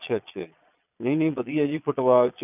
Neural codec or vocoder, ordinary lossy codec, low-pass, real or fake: vocoder, 22.05 kHz, 80 mel bands, Vocos; none; 3.6 kHz; fake